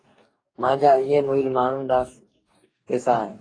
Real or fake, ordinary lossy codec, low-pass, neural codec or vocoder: fake; AAC, 32 kbps; 9.9 kHz; codec, 44.1 kHz, 2.6 kbps, DAC